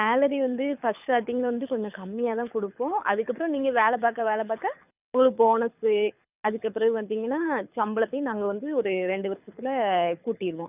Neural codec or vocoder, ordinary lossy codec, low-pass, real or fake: codec, 24 kHz, 6 kbps, HILCodec; none; 3.6 kHz; fake